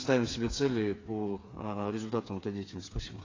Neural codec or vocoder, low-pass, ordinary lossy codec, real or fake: codec, 16 kHz, 8 kbps, FreqCodec, smaller model; 7.2 kHz; AAC, 32 kbps; fake